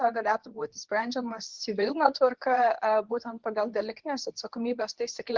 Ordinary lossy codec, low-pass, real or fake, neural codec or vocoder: Opus, 16 kbps; 7.2 kHz; fake; codec, 24 kHz, 0.9 kbps, WavTokenizer, medium speech release version 2